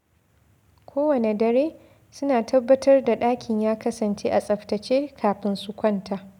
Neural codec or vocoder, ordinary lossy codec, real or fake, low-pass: none; none; real; 19.8 kHz